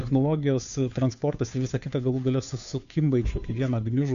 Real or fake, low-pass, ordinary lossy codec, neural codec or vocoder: fake; 7.2 kHz; MP3, 96 kbps; codec, 16 kHz, 2 kbps, FunCodec, trained on Chinese and English, 25 frames a second